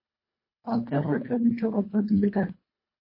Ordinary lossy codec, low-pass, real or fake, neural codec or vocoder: MP3, 24 kbps; 5.4 kHz; fake; codec, 24 kHz, 1.5 kbps, HILCodec